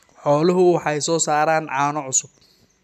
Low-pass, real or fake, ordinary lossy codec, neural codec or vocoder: 14.4 kHz; real; none; none